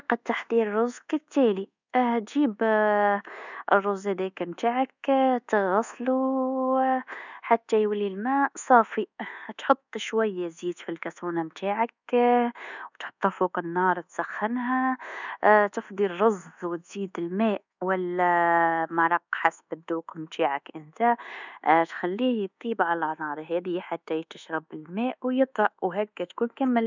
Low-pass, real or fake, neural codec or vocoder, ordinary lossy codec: 7.2 kHz; fake; codec, 24 kHz, 1.2 kbps, DualCodec; none